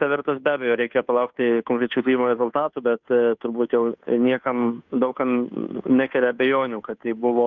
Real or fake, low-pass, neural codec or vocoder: fake; 7.2 kHz; codec, 16 kHz, 2 kbps, FunCodec, trained on Chinese and English, 25 frames a second